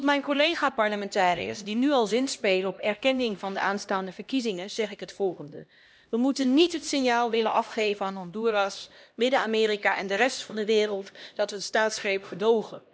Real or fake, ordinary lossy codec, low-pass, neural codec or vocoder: fake; none; none; codec, 16 kHz, 1 kbps, X-Codec, HuBERT features, trained on LibriSpeech